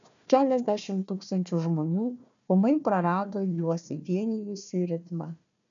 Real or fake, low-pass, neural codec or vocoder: fake; 7.2 kHz; codec, 16 kHz, 1 kbps, FunCodec, trained on Chinese and English, 50 frames a second